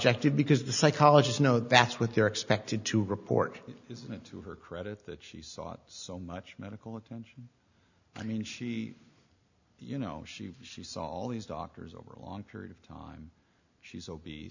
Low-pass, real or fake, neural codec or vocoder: 7.2 kHz; real; none